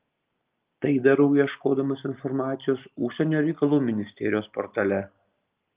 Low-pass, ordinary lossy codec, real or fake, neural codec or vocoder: 3.6 kHz; Opus, 24 kbps; real; none